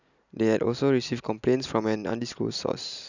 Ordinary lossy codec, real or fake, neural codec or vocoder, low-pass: none; real; none; 7.2 kHz